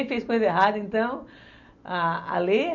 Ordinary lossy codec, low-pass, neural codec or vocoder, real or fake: none; 7.2 kHz; none; real